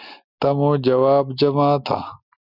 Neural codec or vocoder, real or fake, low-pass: none; real; 5.4 kHz